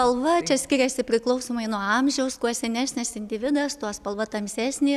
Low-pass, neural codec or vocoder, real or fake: 14.4 kHz; none; real